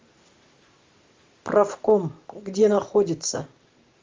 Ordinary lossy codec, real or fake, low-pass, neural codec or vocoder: Opus, 32 kbps; real; 7.2 kHz; none